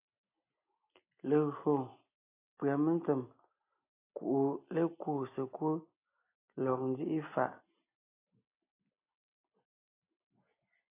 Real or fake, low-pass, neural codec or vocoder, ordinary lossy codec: real; 3.6 kHz; none; AAC, 24 kbps